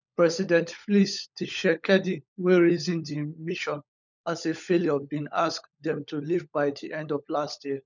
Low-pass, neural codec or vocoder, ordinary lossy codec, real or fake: 7.2 kHz; codec, 16 kHz, 16 kbps, FunCodec, trained on LibriTTS, 50 frames a second; none; fake